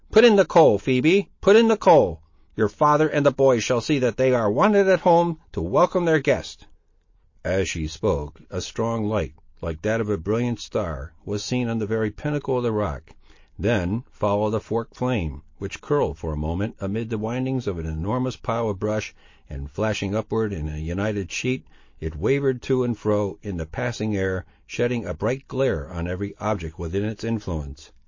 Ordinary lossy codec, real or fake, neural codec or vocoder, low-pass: MP3, 32 kbps; real; none; 7.2 kHz